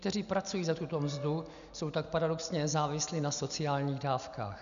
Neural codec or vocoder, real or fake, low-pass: none; real; 7.2 kHz